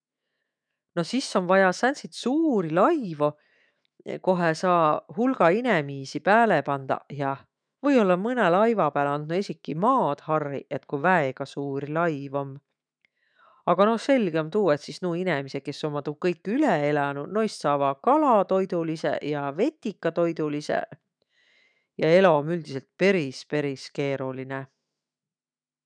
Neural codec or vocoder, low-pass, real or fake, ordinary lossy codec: autoencoder, 48 kHz, 128 numbers a frame, DAC-VAE, trained on Japanese speech; 9.9 kHz; fake; none